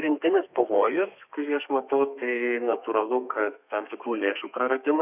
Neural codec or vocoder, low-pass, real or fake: codec, 32 kHz, 1.9 kbps, SNAC; 3.6 kHz; fake